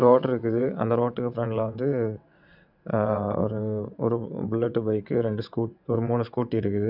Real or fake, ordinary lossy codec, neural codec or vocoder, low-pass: fake; none; vocoder, 22.05 kHz, 80 mel bands, WaveNeXt; 5.4 kHz